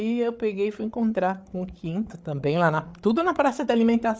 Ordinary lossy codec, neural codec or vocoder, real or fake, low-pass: none; codec, 16 kHz, 8 kbps, FreqCodec, larger model; fake; none